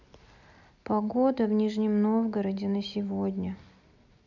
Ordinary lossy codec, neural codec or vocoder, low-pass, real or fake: none; none; 7.2 kHz; real